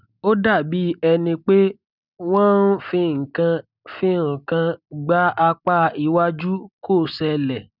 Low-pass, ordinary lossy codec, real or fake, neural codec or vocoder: 5.4 kHz; none; real; none